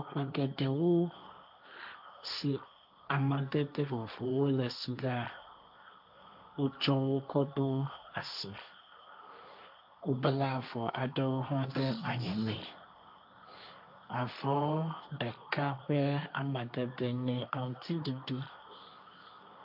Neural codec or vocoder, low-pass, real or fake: codec, 16 kHz, 1.1 kbps, Voila-Tokenizer; 5.4 kHz; fake